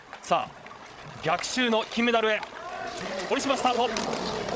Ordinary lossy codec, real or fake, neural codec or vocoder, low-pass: none; fake; codec, 16 kHz, 16 kbps, FreqCodec, larger model; none